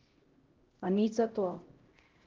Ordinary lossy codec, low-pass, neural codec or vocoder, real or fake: Opus, 16 kbps; 7.2 kHz; codec, 16 kHz, 0.5 kbps, X-Codec, HuBERT features, trained on LibriSpeech; fake